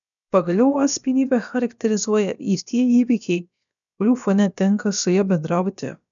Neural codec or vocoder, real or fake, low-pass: codec, 16 kHz, about 1 kbps, DyCAST, with the encoder's durations; fake; 7.2 kHz